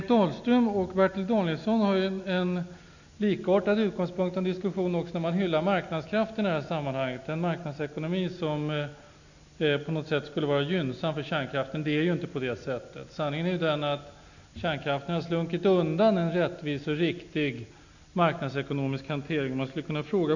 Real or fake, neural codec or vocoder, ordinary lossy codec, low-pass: real; none; none; 7.2 kHz